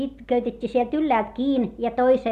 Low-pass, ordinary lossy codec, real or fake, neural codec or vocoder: 14.4 kHz; Opus, 64 kbps; real; none